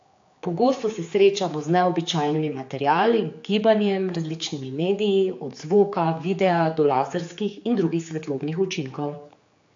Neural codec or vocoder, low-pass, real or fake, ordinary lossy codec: codec, 16 kHz, 4 kbps, X-Codec, HuBERT features, trained on general audio; 7.2 kHz; fake; AAC, 48 kbps